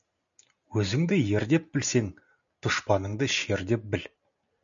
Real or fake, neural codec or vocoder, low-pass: real; none; 7.2 kHz